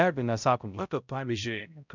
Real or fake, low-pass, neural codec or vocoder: fake; 7.2 kHz; codec, 16 kHz, 0.5 kbps, X-Codec, HuBERT features, trained on balanced general audio